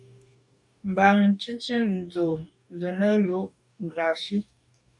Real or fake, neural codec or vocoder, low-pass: fake; codec, 44.1 kHz, 2.6 kbps, DAC; 10.8 kHz